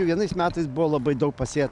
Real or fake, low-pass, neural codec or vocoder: real; 10.8 kHz; none